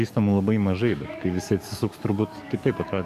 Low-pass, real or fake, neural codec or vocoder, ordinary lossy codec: 14.4 kHz; fake; codec, 44.1 kHz, 7.8 kbps, DAC; AAC, 96 kbps